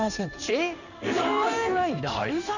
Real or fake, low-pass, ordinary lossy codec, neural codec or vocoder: fake; 7.2 kHz; none; codec, 16 kHz in and 24 kHz out, 1 kbps, XY-Tokenizer